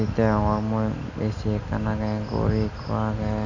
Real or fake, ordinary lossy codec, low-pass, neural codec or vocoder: real; none; 7.2 kHz; none